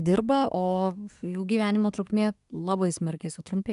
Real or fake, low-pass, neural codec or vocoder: fake; 10.8 kHz; codec, 24 kHz, 1 kbps, SNAC